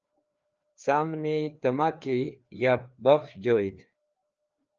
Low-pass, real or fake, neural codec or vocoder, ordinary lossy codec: 7.2 kHz; fake; codec, 16 kHz, 2 kbps, FreqCodec, larger model; Opus, 24 kbps